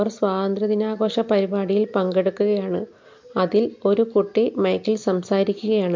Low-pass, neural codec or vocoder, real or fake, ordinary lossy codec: 7.2 kHz; none; real; MP3, 48 kbps